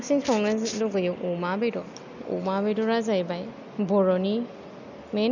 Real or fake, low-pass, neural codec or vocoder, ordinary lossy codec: real; 7.2 kHz; none; none